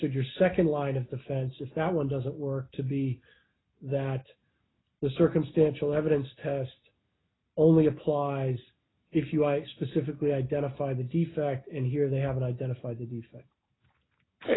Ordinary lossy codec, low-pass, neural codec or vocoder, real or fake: AAC, 16 kbps; 7.2 kHz; none; real